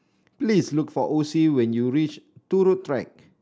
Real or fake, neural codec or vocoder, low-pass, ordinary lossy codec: real; none; none; none